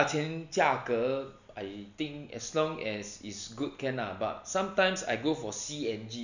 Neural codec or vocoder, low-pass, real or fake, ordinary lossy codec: none; 7.2 kHz; real; none